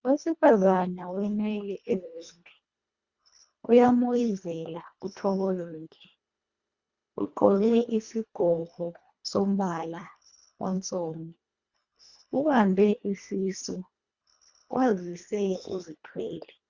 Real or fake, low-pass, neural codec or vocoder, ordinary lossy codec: fake; 7.2 kHz; codec, 24 kHz, 1.5 kbps, HILCodec; Opus, 64 kbps